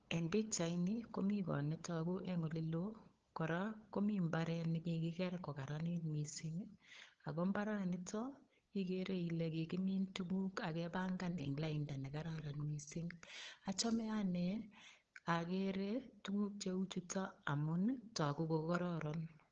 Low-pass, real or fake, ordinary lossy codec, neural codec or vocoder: 7.2 kHz; fake; Opus, 16 kbps; codec, 16 kHz, 8 kbps, FunCodec, trained on LibriTTS, 25 frames a second